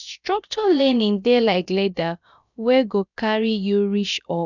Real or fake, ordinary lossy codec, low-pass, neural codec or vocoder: fake; none; 7.2 kHz; codec, 16 kHz, about 1 kbps, DyCAST, with the encoder's durations